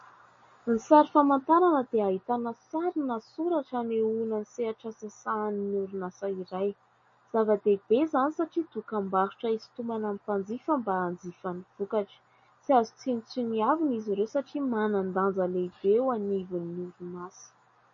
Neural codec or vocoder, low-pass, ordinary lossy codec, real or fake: none; 7.2 kHz; MP3, 32 kbps; real